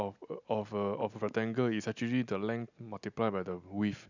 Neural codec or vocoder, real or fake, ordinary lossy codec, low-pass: none; real; none; 7.2 kHz